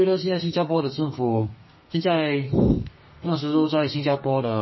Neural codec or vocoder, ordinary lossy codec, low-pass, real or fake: codec, 32 kHz, 1.9 kbps, SNAC; MP3, 24 kbps; 7.2 kHz; fake